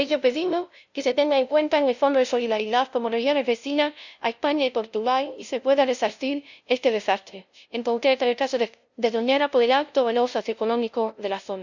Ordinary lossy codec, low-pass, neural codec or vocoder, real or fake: none; 7.2 kHz; codec, 16 kHz, 0.5 kbps, FunCodec, trained on LibriTTS, 25 frames a second; fake